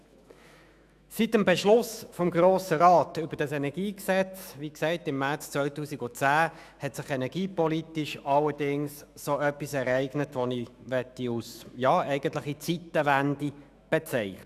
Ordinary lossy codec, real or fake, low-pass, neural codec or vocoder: none; fake; 14.4 kHz; autoencoder, 48 kHz, 128 numbers a frame, DAC-VAE, trained on Japanese speech